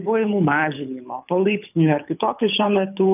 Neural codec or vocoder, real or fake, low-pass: codec, 24 kHz, 6 kbps, HILCodec; fake; 3.6 kHz